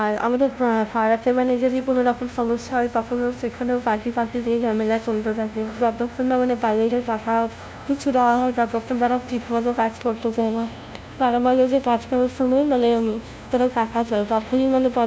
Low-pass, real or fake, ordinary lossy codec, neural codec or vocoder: none; fake; none; codec, 16 kHz, 0.5 kbps, FunCodec, trained on LibriTTS, 25 frames a second